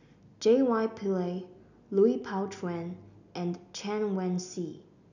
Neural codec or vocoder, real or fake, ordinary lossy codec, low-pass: none; real; none; 7.2 kHz